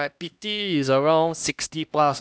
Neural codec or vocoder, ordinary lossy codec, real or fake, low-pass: codec, 16 kHz, 1 kbps, X-Codec, HuBERT features, trained on LibriSpeech; none; fake; none